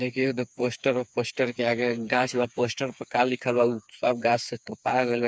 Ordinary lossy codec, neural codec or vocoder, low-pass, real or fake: none; codec, 16 kHz, 4 kbps, FreqCodec, smaller model; none; fake